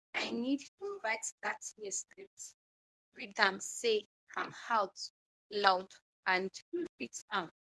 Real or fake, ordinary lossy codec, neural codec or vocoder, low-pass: fake; none; codec, 24 kHz, 0.9 kbps, WavTokenizer, medium speech release version 1; none